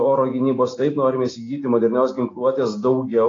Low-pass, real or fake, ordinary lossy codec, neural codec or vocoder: 7.2 kHz; real; AAC, 32 kbps; none